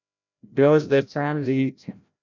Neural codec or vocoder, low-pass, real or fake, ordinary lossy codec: codec, 16 kHz, 0.5 kbps, FreqCodec, larger model; 7.2 kHz; fake; MP3, 64 kbps